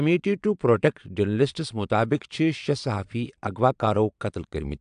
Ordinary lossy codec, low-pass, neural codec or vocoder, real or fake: AAC, 96 kbps; 9.9 kHz; vocoder, 22.05 kHz, 80 mel bands, Vocos; fake